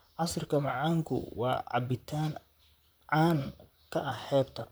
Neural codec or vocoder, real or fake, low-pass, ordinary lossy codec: vocoder, 44.1 kHz, 128 mel bands, Pupu-Vocoder; fake; none; none